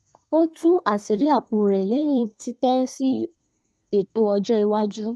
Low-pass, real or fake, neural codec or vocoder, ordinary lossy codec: none; fake; codec, 24 kHz, 1 kbps, SNAC; none